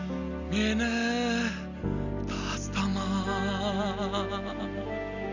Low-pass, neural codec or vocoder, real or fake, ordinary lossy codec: 7.2 kHz; none; real; none